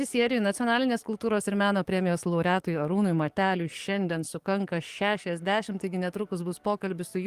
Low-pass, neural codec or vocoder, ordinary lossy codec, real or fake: 14.4 kHz; codec, 44.1 kHz, 7.8 kbps, DAC; Opus, 24 kbps; fake